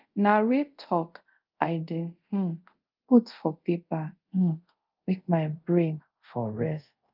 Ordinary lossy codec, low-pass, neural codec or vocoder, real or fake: Opus, 24 kbps; 5.4 kHz; codec, 24 kHz, 0.5 kbps, DualCodec; fake